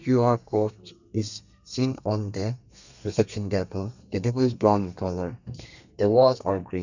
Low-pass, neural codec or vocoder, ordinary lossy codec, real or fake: 7.2 kHz; codec, 32 kHz, 1.9 kbps, SNAC; none; fake